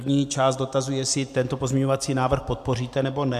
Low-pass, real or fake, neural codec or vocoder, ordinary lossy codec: 14.4 kHz; real; none; AAC, 96 kbps